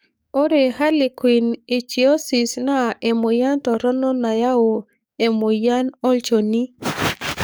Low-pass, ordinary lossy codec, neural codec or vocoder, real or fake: none; none; codec, 44.1 kHz, 7.8 kbps, DAC; fake